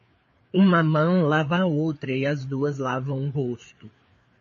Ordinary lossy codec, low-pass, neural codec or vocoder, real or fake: MP3, 32 kbps; 7.2 kHz; codec, 16 kHz, 4 kbps, FreqCodec, larger model; fake